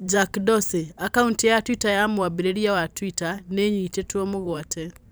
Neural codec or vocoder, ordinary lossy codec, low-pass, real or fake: vocoder, 44.1 kHz, 128 mel bands every 512 samples, BigVGAN v2; none; none; fake